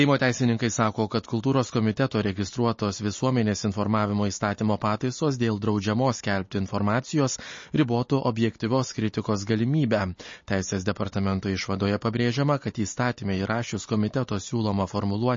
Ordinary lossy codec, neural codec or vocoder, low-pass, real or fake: MP3, 32 kbps; none; 7.2 kHz; real